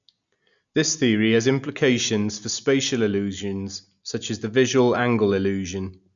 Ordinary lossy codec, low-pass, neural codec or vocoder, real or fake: none; 7.2 kHz; none; real